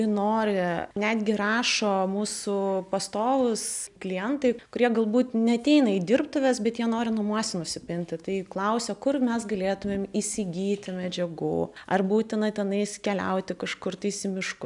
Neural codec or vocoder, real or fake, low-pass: none; real; 10.8 kHz